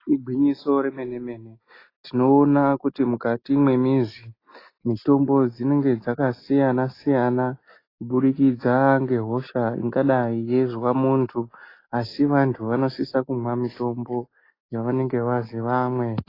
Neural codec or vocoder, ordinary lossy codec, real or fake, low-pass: none; AAC, 24 kbps; real; 5.4 kHz